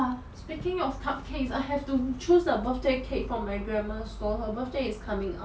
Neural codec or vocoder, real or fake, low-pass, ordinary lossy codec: none; real; none; none